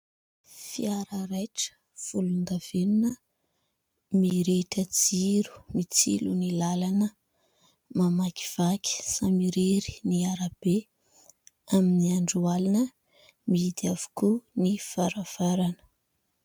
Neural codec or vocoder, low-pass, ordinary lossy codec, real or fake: none; 19.8 kHz; MP3, 96 kbps; real